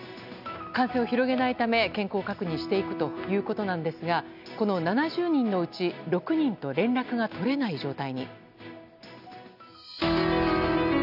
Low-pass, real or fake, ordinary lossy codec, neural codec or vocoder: 5.4 kHz; real; none; none